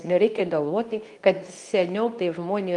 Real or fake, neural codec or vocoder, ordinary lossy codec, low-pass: fake; codec, 24 kHz, 0.9 kbps, WavTokenizer, medium speech release version 2; Opus, 32 kbps; 10.8 kHz